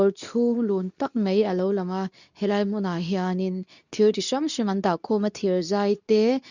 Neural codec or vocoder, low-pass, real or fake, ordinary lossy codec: codec, 24 kHz, 0.9 kbps, WavTokenizer, medium speech release version 2; 7.2 kHz; fake; none